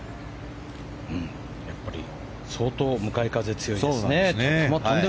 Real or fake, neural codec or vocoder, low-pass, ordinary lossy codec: real; none; none; none